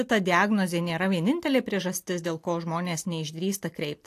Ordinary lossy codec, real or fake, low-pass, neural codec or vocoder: AAC, 64 kbps; real; 14.4 kHz; none